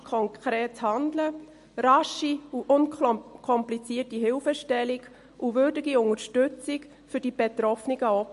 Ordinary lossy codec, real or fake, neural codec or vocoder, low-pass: MP3, 48 kbps; real; none; 14.4 kHz